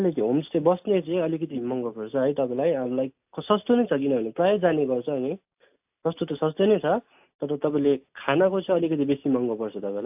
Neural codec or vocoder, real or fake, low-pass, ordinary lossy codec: none; real; 3.6 kHz; AAC, 32 kbps